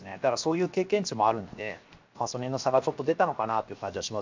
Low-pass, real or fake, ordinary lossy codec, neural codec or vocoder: 7.2 kHz; fake; MP3, 64 kbps; codec, 16 kHz, 0.7 kbps, FocalCodec